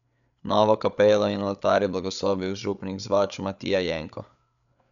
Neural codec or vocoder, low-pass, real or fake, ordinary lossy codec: codec, 16 kHz, 8 kbps, FreqCodec, larger model; 7.2 kHz; fake; none